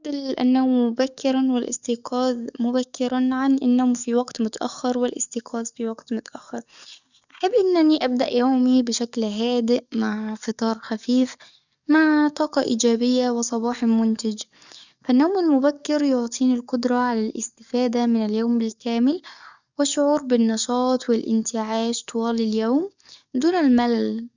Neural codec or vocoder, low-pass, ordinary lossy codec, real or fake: codec, 44.1 kHz, 7.8 kbps, DAC; 7.2 kHz; none; fake